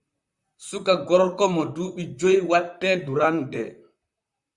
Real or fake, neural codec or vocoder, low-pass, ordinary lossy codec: fake; vocoder, 44.1 kHz, 128 mel bands, Pupu-Vocoder; 10.8 kHz; Opus, 64 kbps